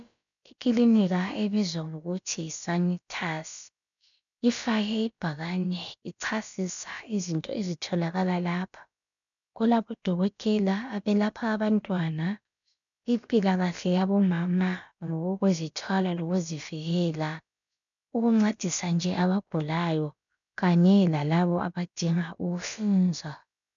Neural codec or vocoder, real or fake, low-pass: codec, 16 kHz, about 1 kbps, DyCAST, with the encoder's durations; fake; 7.2 kHz